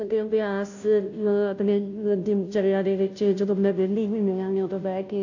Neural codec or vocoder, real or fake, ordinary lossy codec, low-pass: codec, 16 kHz, 0.5 kbps, FunCodec, trained on Chinese and English, 25 frames a second; fake; AAC, 48 kbps; 7.2 kHz